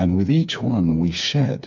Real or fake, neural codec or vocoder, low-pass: fake; codec, 16 kHz, 4 kbps, FreqCodec, smaller model; 7.2 kHz